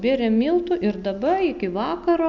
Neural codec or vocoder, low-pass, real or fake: none; 7.2 kHz; real